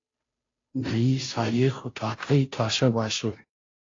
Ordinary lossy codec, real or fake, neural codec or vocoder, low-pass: AAC, 32 kbps; fake; codec, 16 kHz, 0.5 kbps, FunCodec, trained on Chinese and English, 25 frames a second; 7.2 kHz